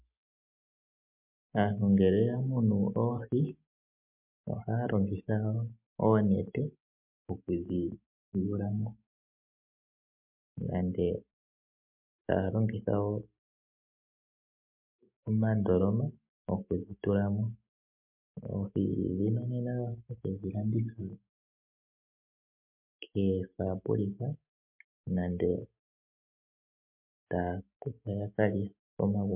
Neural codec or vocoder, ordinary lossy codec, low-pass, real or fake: none; MP3, 32 kbps; 3.6 kHz; real